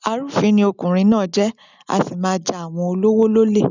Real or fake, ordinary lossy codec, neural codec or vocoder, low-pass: real; none; none; 7.2 kHz